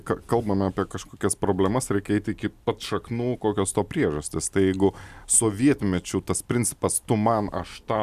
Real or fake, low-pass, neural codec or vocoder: real; 14.4 kHz; none